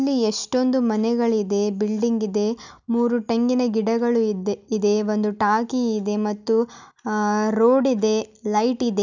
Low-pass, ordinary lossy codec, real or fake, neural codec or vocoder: 7.2 kHz; none; real; none